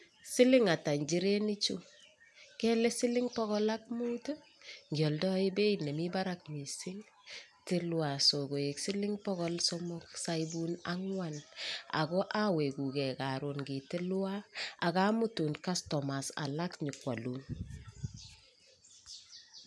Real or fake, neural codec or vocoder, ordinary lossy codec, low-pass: real; none; none; none